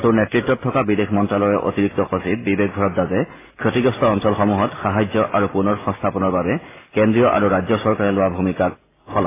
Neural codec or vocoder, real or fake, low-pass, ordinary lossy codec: none; real; 3.6 kHz; AAC, 16 kbps